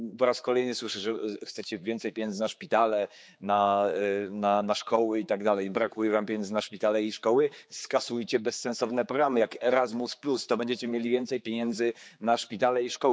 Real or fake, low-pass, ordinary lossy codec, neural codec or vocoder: fake; none; none; codec, 16 kHz, 4 kbps, X-Codec, HuBERT features, trained on general audio